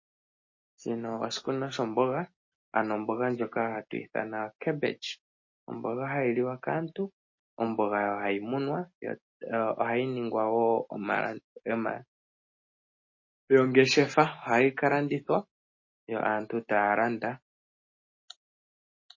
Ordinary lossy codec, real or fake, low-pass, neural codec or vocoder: MP3, 32 kbps; real; 7.2 kHz; none